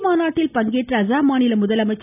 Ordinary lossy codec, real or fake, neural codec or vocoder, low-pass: none; real; none; 3.6 kHz